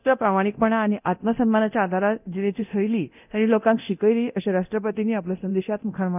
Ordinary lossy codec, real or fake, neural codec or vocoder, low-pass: none; fake; codec, 24 kHz, 0.9 kbps, DualCodec; 3.6 kHz